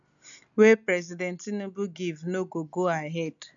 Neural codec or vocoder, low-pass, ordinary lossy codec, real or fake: none; 7.2 kHz; none; real